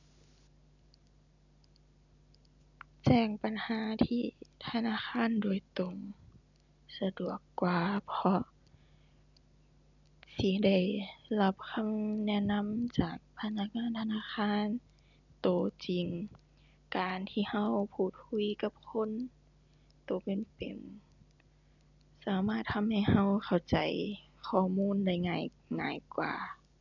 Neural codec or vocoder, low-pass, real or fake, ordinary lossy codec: none; 7.2 kHz; real; none